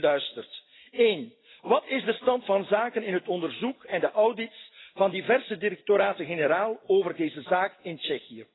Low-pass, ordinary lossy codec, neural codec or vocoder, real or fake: 7.2 kHz; AAC, 16 kbps; none; real